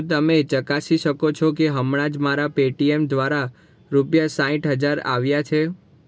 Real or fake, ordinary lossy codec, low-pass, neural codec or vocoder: real; none; none; none